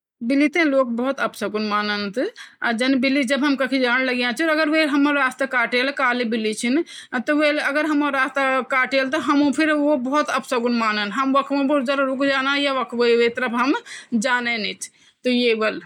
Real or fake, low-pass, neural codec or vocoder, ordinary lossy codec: real; 19.8 kHz; none; none